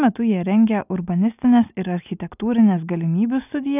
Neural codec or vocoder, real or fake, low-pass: none; real; 3.6 kHz